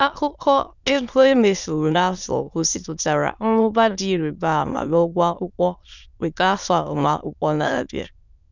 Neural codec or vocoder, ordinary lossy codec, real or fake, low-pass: autoencoder, 22.05 kHz, a latent of 192 numbers a frame, VITS, trained on many speakers; none; fake; 7.2 kHz